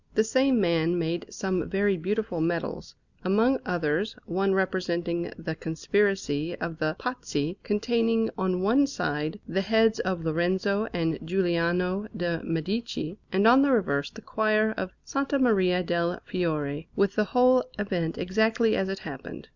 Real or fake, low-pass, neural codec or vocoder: real; 7.2 kHz; none